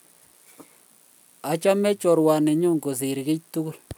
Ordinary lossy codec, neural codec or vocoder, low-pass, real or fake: none; none; none; real